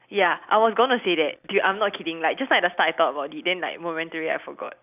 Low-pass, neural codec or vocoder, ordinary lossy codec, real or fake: 3.6 kHz; none; none; real